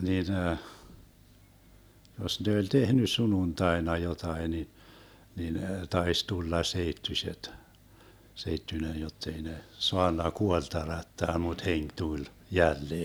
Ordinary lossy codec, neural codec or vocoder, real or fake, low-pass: none; none; real; none